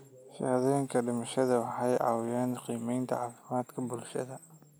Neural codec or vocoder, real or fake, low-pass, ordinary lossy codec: none; real; none; none